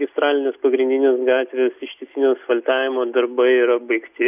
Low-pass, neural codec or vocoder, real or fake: 3.6 kHz; none; real